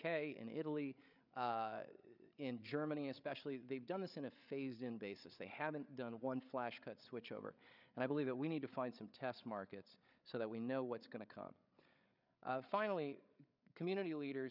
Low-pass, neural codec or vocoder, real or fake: 5.4 kHz; codec, 16 kHz, 8 kbps, FreqCodec, larger model; fake